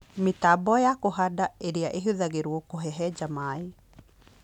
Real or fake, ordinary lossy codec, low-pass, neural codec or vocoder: real; none; 19.8 kHz; none